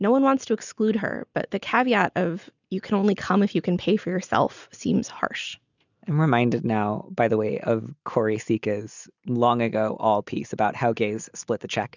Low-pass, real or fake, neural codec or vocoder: 7.2 kHz; real; none